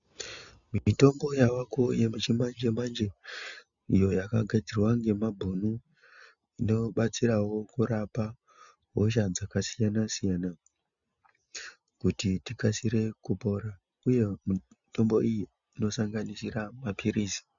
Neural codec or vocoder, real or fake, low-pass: none; real; 7.2 kHz